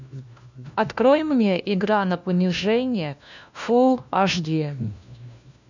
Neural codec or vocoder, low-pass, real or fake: codec, 16 kHz, 1 kbps, FunCodec, trained on LibriTTS, 50 frames a second; 7.2 kHz; fake